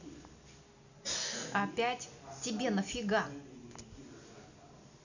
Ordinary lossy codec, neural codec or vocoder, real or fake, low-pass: none; none; real; 7.2 kHz